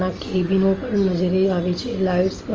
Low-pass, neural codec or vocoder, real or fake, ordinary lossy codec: 7.2 kHz; none; real; Opus, 24 kbps